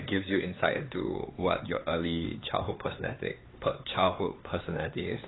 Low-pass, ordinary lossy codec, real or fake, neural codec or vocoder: 7.2 kHz; AAC, 16 kbps; fake; codec, 16 kHz, 4 kbps, X-Codec, HuBERT features, trained on LibriSpeech